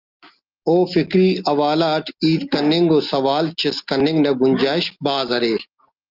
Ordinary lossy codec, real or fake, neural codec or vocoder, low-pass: Opus, 24 kbps; real; none; 5.4 kHz